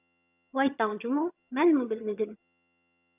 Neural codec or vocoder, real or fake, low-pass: vocoder, 22.05 kHz, 80 mel bands, HiFi-GAN; fake; 3.6 kHz